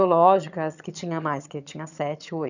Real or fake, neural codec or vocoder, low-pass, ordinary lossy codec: fake; vocoder, 22.05 kHz, 80 mel bands, HiFi-GAN; 7.2 kHz; none